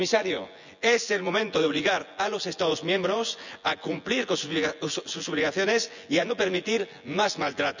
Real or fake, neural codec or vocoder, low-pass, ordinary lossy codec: fake; vocoder, 24 kHz, 100 mel bands, Vocos; 7.2 kHz; none